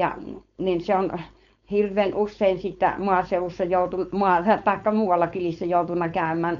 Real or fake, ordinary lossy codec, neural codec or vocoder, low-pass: fake; MP3, 64 kbps; codec, 16 kHz, 4.8 kbps, FACodec; 7.2 kHz